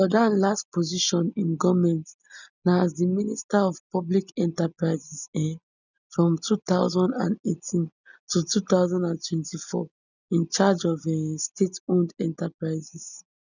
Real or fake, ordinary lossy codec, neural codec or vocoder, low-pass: real; none; none; 7.2 kHz